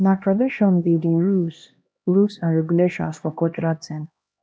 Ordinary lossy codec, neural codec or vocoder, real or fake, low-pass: none; codec, 16 kHz, 1 kbps, X-Codec, HuBERT features, trained on LibriSpeech; fake; none